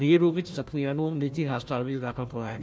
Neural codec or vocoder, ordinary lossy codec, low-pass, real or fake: codec, 16 kHz, 1 kbps, FunCodec, trained on Chinese and English, 50 frames a second; none; none; fake